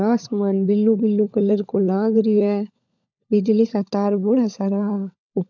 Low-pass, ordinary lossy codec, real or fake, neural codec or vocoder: 7.2 kHz; none; fake; codec, 16 kHz, 16 kbps, FunCodec, trained on LibriTTS, 50 frames a second